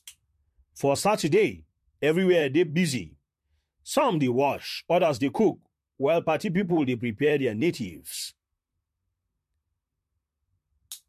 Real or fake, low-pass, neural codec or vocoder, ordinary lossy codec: fake; 14.4 kHz; vocoder, 44.1 kHz, 128 mel bands, Pupu-Vocoder; MP3, 64 kbps